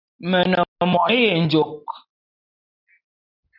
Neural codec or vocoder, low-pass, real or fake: none; 5.4 kHz; real